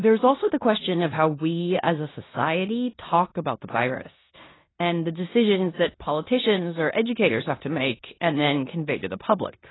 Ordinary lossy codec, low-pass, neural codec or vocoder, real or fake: AAC, 16 kbps; 7.2 kHz; codec, 16 kHz in and 24 kHz out, 0.9 kbps, LongCat-Audio-Codec, four codebook decoder; fake